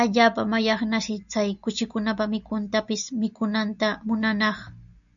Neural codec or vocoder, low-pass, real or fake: none; 7.2 kHz; real